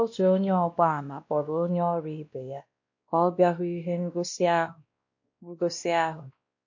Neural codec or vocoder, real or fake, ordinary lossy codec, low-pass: codec, 16 kHz, 1 kbps, X-Codec, WavLM features, trained on Multilingual LibriSpeech; fake; MP3, 48 kbps; 7.2 kHz